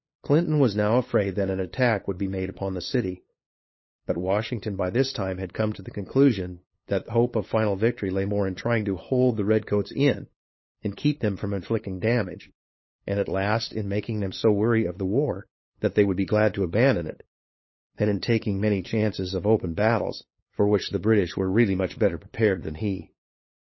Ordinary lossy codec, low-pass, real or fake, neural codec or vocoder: MP3, 24 kbps; 7.2 kHz; fake; codec, 16 kHz, 8 kbps, FunCodec, trained on LibriTTS, 25 frames a second